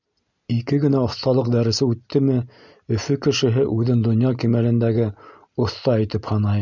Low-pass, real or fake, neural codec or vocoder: 7.2 kHz; real; none